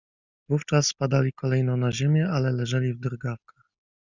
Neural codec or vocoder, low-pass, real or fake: none; 7.2 kHz; real